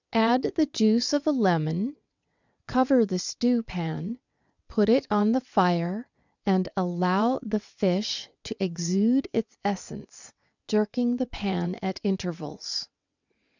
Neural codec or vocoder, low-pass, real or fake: vocoder, 22.05 kHz, 80 mel bands, WaveNeXt; 7.2 kHz; fake